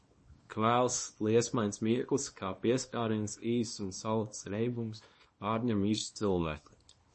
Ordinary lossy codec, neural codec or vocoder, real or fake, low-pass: MP3, 32 kbps; codec, 24 kHz, 0.9 kbps, WavTokenizer, small release; fake; 10.8 kHz